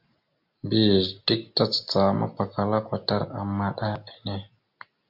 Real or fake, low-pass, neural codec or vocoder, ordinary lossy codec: real; 5.4 kHz; none; MP3, 48 kbps